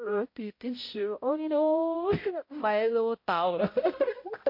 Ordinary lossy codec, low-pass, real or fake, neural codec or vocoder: MP3, 32 kbps; 5.4 kHz; fake; codec, 16 kHz, 0.5 kbps, X-Codec, HuBERT features, trained on balanced general audio